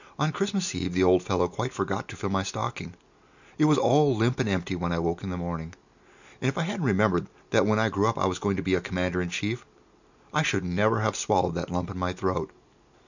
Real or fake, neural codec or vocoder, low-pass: real; none; 7.2 kHz